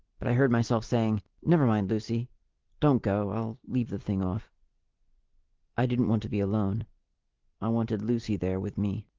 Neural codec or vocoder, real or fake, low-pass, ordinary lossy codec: none; real; 7.2 kHz; Opus, 16 kbps